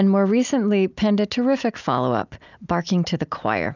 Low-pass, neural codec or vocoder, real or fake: 7.2 kHz; none; real